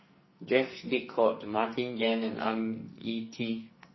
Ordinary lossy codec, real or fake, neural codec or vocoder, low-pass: MP3, 24 kbps; fake; codec, 44.1 kHz, 2.6 kbps, SNAC; 7.2 kHz